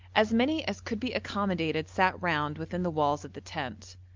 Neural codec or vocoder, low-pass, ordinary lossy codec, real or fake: none; 7.2 kHz; Opus, 24 kbps; real